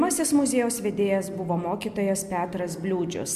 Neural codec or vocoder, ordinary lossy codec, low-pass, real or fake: none; MP3, 96 kbps; 14.4 kHz; real